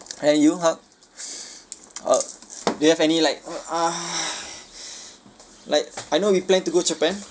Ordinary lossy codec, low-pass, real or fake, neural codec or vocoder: none; none; real; none